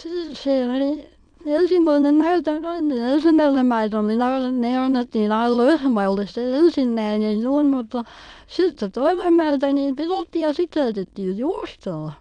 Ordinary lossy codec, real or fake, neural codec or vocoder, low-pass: none; fake; autoencoder, 22.05 kHz, a latent of 192 numbers a frame, VITS, trained on many speakers; 9.9 kHz